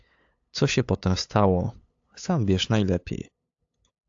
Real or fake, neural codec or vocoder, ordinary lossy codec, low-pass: fake; codec, 16 kHz, 8 kbps, FunCodec, trained on LibriTTS, 25 frames a second; AAC, 48 kbps; 7.2 kHz